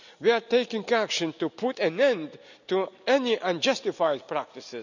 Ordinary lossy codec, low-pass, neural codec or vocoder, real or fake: none; 7.2 kHz; none; real